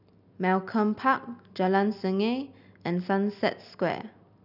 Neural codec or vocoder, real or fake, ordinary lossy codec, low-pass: none; real; none; 5.4 kHz